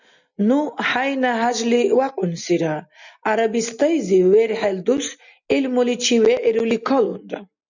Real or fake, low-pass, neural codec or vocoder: real; 7.2 kHz; none